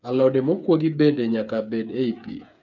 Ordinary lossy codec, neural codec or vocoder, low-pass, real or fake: none; codec, 16 kHz, 8 kbps, FreqCodec, smaller model; 7.2 kHz; fake